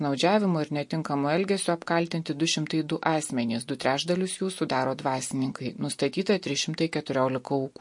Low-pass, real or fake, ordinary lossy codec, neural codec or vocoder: 10.8 kHz; real; MP3, 48 kbps; none